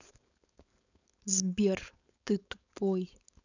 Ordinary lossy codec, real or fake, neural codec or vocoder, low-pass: none; fake; codec, 16 kHz, 4.8 kbps, FACodec; 7.2 kHz